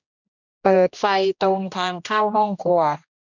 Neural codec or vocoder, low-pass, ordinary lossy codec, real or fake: codec, 16 kHz, 1 kbps, X-Codec, HuBERT features, trained on general audio; 7.2 kHz; none; fake